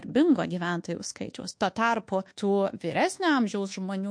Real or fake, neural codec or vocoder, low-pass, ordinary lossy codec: fake; codec, 24 kHz, 1.2 kbps, DualCodec; 9.9 kHz; MP3, 48 kbps